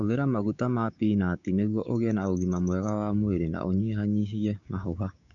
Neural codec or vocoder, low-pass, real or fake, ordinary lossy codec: codec, 16 kHz, 6 kbps, DAC; 7.2 kHz; fake; none